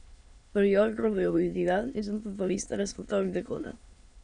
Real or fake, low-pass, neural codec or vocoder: fake; 9.9 kHz; autoencoder, 22.05 kHz, a latent of 192 numbers a frame, VITS, trained on many speakers